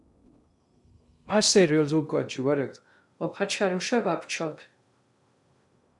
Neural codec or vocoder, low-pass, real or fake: codec, 16 kHz in and 24 kHz out, 0.6 kbps, FocalCodec, streaming, 2048 codes; 10.8 kHz; fake